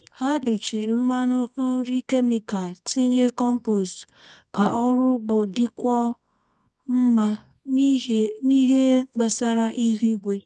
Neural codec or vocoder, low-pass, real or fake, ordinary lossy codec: codec, 24 kHz, 0.9 kbps, WavTokenizer, medium music audio release; none; fake; none